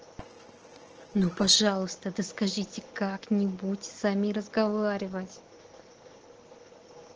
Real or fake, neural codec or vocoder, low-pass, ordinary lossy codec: real; none; 7.2 kHz; Opus, 16 kbps